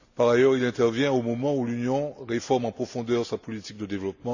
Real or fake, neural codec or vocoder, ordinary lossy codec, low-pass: real; none; none; 7.2 kHz